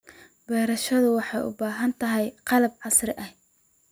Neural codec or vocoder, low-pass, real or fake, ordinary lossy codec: none; none; real; none